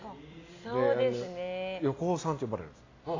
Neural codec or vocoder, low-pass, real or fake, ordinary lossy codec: none; 7.2 kHz; real; AAC, 32 kbps